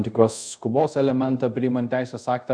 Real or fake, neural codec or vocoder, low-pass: fake; codec, 24 kHz, 0.5 kbps, DualCodec; 9.9 kHz